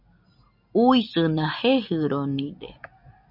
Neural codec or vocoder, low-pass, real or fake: none; 5.4 kHz; real